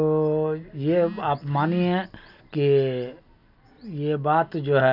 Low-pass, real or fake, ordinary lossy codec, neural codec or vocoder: 5.4 kHz; real; none; none